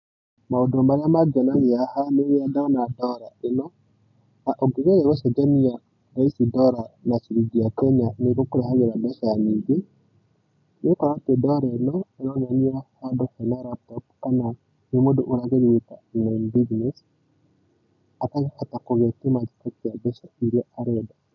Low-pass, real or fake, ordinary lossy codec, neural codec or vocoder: 7.2 kHz; real; none; none